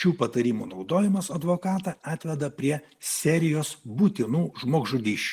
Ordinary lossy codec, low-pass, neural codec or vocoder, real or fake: Opus, 24 kbps; 14.4 kHz; none; real